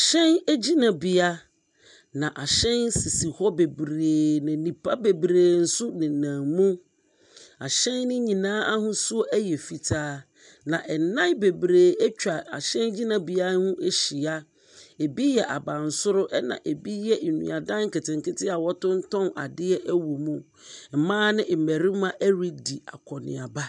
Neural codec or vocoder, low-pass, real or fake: none; 10.8 kHz; real